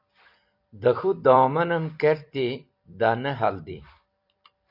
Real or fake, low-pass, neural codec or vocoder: fake; 5.4 kHz; vocoder, 44.1 kHz, 128 mel bands every 256 samples, BigVGAN v2